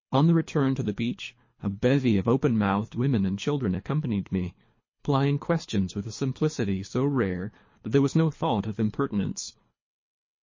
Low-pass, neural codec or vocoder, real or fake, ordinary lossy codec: 7.2 kHz; codec, 24 kHz, 3 kbps, HILCodec; fake; MP3, 32 kbps